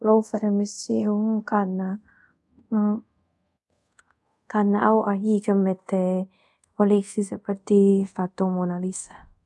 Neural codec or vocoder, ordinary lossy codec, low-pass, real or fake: codec, 24 kHz, 0.5 kbps, DualCodec; none; none; fake